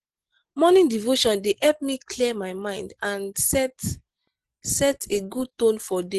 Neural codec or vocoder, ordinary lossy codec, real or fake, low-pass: none; Opus, 16 kbps; real; 10.8 kHz